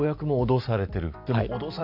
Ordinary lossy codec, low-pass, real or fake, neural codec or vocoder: none; 5.4 kHz; real; none